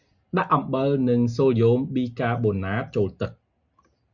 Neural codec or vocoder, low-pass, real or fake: none; 7.2 kHz; real